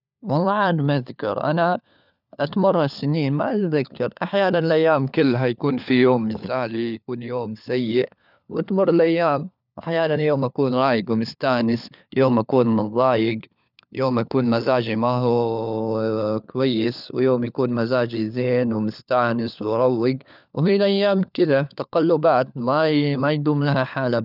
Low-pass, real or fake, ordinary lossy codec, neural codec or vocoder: 5.4 kHz; fake; none; codec, 16 kHz, 4 kbps, FunCodec, trained on LibriTTS, 50 frames a second